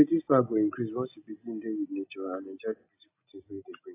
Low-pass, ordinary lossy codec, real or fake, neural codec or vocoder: 3.6 kHz; AAC, 24 kbps; real; none